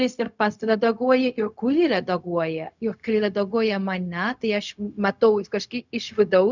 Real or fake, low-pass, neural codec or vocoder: fake; 7.2 kHz; codec, 16 kHz, 0.4 kbps, LongCat-Audio-Codec